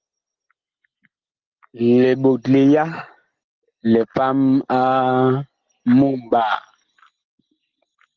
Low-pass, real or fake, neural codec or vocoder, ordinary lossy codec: 7.2 kHz; real; none; Opus, 16 kbps